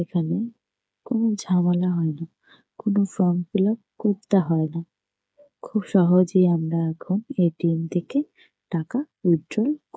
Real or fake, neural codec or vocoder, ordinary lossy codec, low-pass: fake; codec, 16 kHz, 8 kbps, FreqCodec, smaller model; none; none